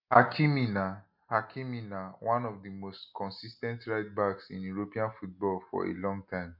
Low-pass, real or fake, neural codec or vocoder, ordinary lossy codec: 5.4 kHz; real; none; MP3, 48 kbps